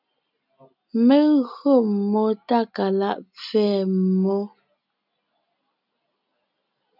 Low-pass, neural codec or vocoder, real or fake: 5.4 kHz; none; real